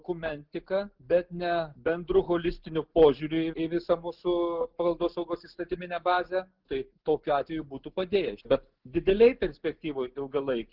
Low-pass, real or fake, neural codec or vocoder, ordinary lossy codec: 5.4 kHz; real; none; Opus, 16 kbps